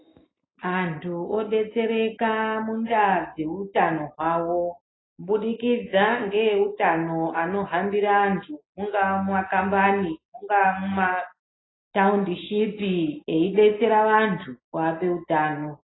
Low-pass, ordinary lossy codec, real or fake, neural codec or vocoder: 7.2 kHz; AAC, 16 kbps; real; none